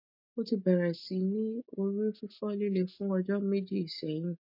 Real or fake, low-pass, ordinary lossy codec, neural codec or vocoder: real; 5.4 kHz; MP3, 32 kbps; none